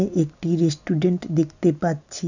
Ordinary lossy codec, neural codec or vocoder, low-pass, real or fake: none; none; 7.2 kHz; real